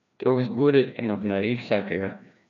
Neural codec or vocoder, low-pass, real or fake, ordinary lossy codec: codec, 16 kHz, 1 kbps, FreqCodec, larger model; 7.2 kHz; fake; none